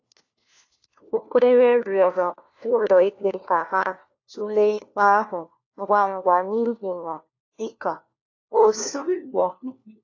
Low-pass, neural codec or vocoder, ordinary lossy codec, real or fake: 7.2 kHz; codec, 16 kHz, 1 kbps, FunCodec, trained on LibriTTS, 50 frames a second; AAC, 48 kbps; fake